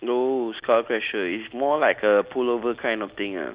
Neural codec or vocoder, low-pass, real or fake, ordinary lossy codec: none; 3.6 kHz; real; Opus, 64 kbps